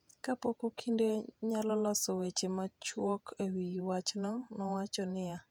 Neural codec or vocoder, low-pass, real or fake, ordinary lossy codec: vocoder, 48 kHz, 128 mel bands, Vocos; 19.8 kHz; fake; none